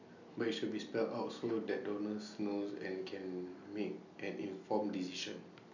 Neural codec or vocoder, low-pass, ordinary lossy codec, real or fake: none; 7.2 kHz; none; real